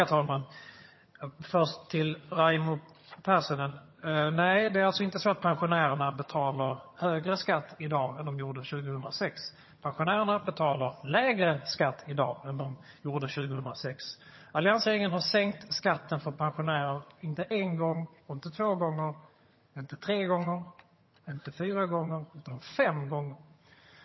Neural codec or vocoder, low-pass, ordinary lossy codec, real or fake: vocoder, 22.05 kHz, 80 mel bands, HiFi-GAN; 7.2 kHz; MP3, 24 kbps; fake